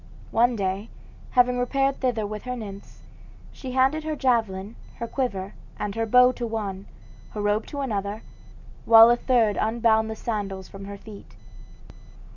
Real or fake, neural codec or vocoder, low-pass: real; none; 7.2 kHz